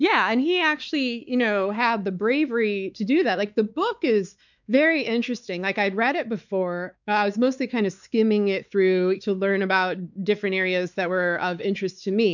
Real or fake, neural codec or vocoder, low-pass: fake; codec, 16 kHz, 4 kbps, X-Codec, WavLM features, trained on Multilingual LibriSpeech; 7.2 kHz